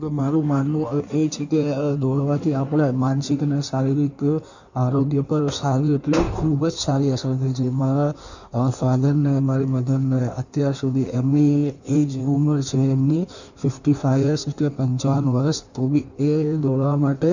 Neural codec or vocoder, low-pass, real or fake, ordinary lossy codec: codec, 16 kHz in and 24 kHz out, 1.1 kbps, FireRedTTS-2 codec; 7.2 kHz; fake; none